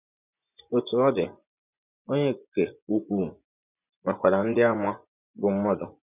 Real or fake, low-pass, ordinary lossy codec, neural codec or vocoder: fake; 3.6 kHz; none; vocoder, 24 kHz, 100 mel bands, Vocos